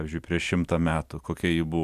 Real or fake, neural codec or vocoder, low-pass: fake; vocoder, 44.1 kHz, 128 mel bands every 256 samples, BigVGAN v2; 14.4 kHz